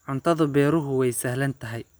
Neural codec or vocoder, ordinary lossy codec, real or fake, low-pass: none; none; real; none